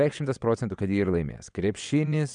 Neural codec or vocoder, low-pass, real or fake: vocoder, 22.05 kHz, 80 mel bands, WaveNeXt; 9.9 kHz; fake